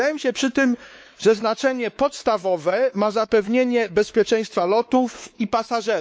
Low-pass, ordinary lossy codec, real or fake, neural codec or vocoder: none; none; fake; codec, 16 kHz, 4 kbps, X-Codec, WavLM features, trained on Multilingual LibriSpeech